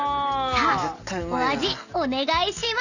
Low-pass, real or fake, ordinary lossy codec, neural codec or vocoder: 7.2 kHz; real; none; none